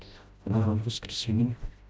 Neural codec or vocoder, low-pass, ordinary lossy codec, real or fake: codec, 16 kHz, 0.5 kbps, FreqCodec, smaller model; none; none; fake